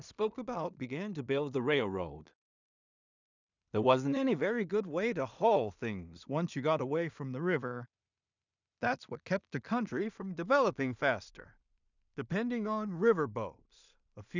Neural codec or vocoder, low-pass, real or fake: codec, 16 kHz in and 24 kHz out, 0.4 kbps, LongCat-Audio-Codec, two codebook decoder; 7.2 kHz; fake